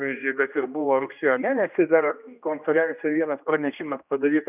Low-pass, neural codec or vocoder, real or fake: 3.6 kHz; codec, 16 kHz, 1 kbps, X-Codec, HuBERT features, trained on general audio; fake